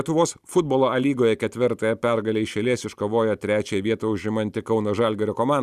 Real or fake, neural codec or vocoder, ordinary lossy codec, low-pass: real; none; Opus, 64 kbps; 14.4 kHz